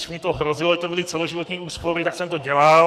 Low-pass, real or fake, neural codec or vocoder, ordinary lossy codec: 14.4 kHz; fake; codec, 44.1 kHz, 2.6 kbps, SNAC; Opus, 64 kbps